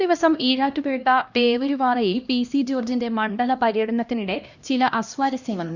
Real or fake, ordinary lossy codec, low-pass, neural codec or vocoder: fake; Opus, 64 kbps; 7.2 kHz; codec, 16 kHz, 1 kbps, X-Codec, HuBERT features, trained on LibriSpeech